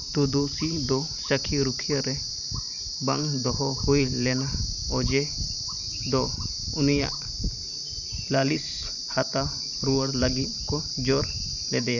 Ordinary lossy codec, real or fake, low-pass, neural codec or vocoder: none; fake; 7.2 kHz; vocoder, 44.1 kHz, 128 mel bands every 512 samples, BigVGAN v2